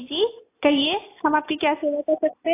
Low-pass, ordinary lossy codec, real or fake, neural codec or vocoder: 3.6 kHz; AAC, 24 kbps; real; none